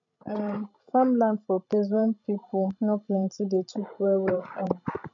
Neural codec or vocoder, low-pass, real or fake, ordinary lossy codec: codec, 16 kHz, 16 kbps, FreqCodec, larger model; 7.2 kHz; fake; none